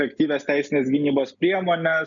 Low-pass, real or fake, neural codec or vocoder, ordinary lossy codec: 7.2 kHz; real; none; MP3, 96 kbps